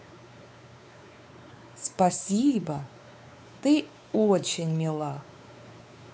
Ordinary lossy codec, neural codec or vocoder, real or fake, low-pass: none; codec, 16 kHz, 4 kbps, X-Codec, WavLM features, trained on Multilingual LibriSpeech; fake; none